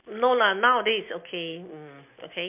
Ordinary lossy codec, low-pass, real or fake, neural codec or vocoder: MP3, 32 kbps; 3.6 kHz; real; none